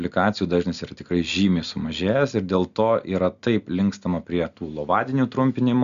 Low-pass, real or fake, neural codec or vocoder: 7.2 kHz; real; none